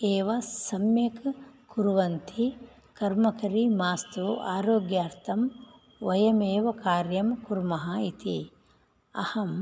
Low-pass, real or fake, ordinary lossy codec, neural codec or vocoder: none; real; none; none